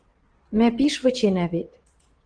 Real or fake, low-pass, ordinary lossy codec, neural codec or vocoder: fake; 9.9 kHz; Opus, 16 kbps; vocoder, 22.05 kHz, 80 mel bands, WaveNeXt